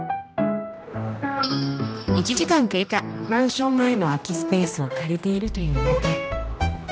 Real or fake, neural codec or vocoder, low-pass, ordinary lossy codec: fake; codec, 16 kHz, 1 kbps, X-Codec, HuBERT features, trained on general audio; none; none